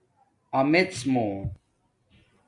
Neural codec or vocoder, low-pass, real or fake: none; 10.8 kHz; real